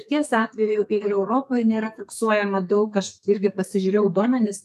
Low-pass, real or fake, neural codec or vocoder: 14.4 kHz; fake; codec, 32 kHz, 1.9 kbps, SNAC